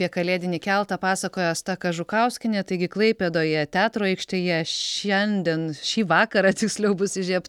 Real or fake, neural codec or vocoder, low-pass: real; none; 19.8 kHz